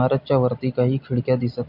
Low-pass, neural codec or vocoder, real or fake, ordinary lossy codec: 5.4 kHz; none; real; MP3, 48 kbps